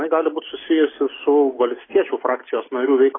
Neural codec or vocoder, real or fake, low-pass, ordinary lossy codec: none; real; 7.2 kHz; AAC, 16 kbps